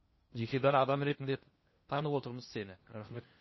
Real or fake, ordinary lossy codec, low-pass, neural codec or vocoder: fake; MP3, 24 kbps; 7.2 kHz; codec, 16 kHz in and 24 kHz out, 0.8 kbps, FocalCodec, streaming, 65536 codes